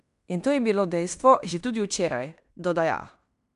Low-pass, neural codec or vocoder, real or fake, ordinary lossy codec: 10.8 kHz; codec, 16 kHz in and 24 kHz out, 0.9 kbps, LongCat-Audio-Codec, fine tuned four codebook decoder; fake; none